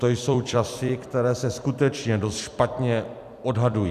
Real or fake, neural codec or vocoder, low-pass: fake; vocoder, 44.1 kHz, 128 mel bands every 256 samples, BigVGAN v2; 14.4 kHz